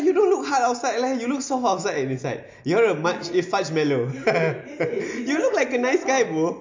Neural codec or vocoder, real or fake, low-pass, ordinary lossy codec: none; real; 7.2 kHz; MP3, 48 kbps